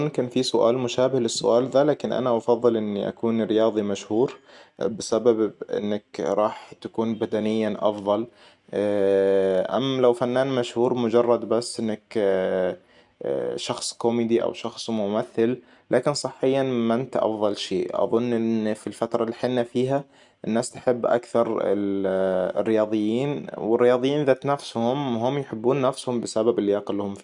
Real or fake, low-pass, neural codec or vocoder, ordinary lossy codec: real; 10.8 kHz; none; none